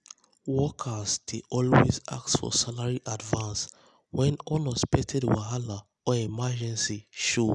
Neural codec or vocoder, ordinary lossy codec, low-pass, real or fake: none; none; 10.8 kHz; real